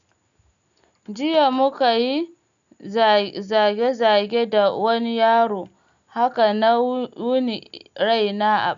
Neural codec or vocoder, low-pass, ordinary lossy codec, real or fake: none; 7.2 kHz; none; real